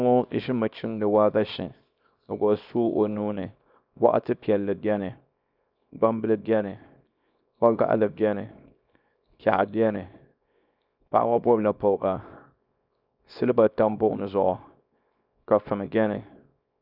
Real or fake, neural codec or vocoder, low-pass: fake; codec, 24 kHz, 0.9 kbps, WavTokenizer, small release; 5.4 kHz